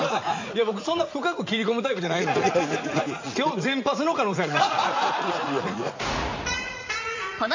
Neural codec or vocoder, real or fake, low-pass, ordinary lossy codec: vocoder, 44.1 kHz, 80 mel bands, Vocos; fake; 7.2 kHz; MP3, 48 kbps